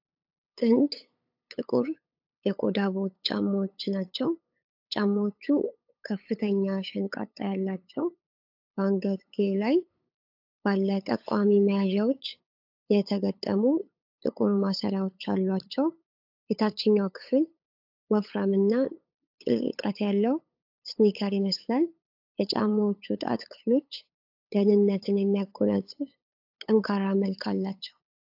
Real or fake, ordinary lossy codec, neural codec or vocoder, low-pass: fake; AAC, 48 kbps; codec, 16 kHz, 8 kbps, FunCodec, trained on LibriTTS, 25 frames a second; 5.4 kHz